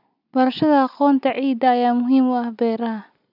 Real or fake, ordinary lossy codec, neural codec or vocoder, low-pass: real; none; none; 5.4 kHz